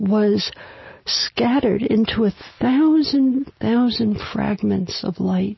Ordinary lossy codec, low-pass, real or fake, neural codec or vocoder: MP3, 24 kbps; 7.2 kHz; real; none